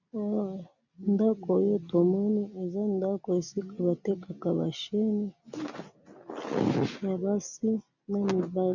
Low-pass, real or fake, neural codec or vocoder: 7.2 kHz; real; none